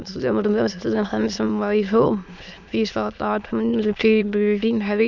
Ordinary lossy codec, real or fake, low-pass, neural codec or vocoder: none; fake; 7.2 kHz; autoencoder, 22.05 kHz, a latent of 192 numbers a frame, VITS, trained on many speakers